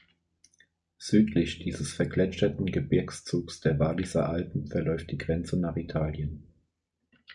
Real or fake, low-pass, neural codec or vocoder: real; 10.8 kHz; none